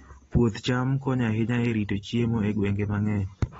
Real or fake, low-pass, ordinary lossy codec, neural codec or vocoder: real; 19.8 kHz; AAC, 24 kbps; none